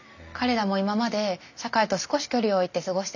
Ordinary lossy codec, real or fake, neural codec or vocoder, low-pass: none; real; none; 7.2 kHz